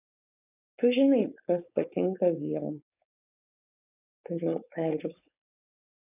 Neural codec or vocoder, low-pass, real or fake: codec, 16 kHz, 4.8 kbps, FACodec; 3.6 kHz; fake